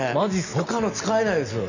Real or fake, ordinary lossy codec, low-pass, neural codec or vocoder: real; none; 7.2 kHz; none